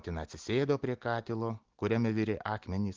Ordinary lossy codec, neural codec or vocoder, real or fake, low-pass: Opus, 16 kbps; none; real; 7.2 kHz